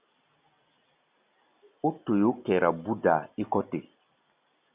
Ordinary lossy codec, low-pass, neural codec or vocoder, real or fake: Opus, 64 kbps; 3.6 kHz; none; real